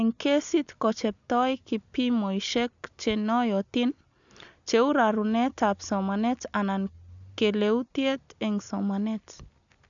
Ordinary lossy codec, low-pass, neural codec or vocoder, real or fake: none; 7.2 kHz; none; real